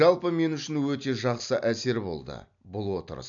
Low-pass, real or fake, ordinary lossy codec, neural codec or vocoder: 7.2 kHz; real; none; none